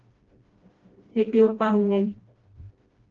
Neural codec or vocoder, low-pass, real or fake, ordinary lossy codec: codec, 16 kHz, 1 kbps, FreqCodec, smaller model; 7.2 kHz; fake; Opus, 16 kbps